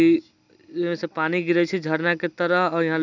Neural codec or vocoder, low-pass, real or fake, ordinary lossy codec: none; 7.2 kHz; real; none